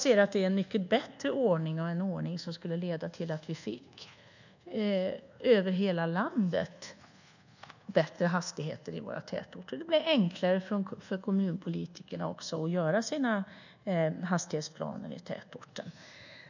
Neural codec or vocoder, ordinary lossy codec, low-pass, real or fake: codec, 24 kHz, 1.2 kbps, DualCodec; none; 7.2 kHz; fake